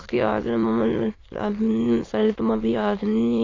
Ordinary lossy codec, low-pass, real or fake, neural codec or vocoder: AAC, 32 kbps; 7.2 kHz; fake; autoencoder, 22.05 kHz, a latent of 192 numbers a frame, VITS, trained on many speakers